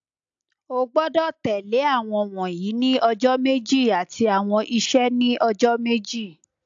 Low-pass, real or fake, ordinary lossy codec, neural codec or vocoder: 7.2 kHz; real; AAC, 64 kbps; none